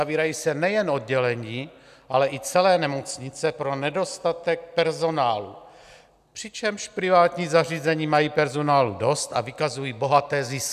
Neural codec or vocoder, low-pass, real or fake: none; 14.4 kHz; real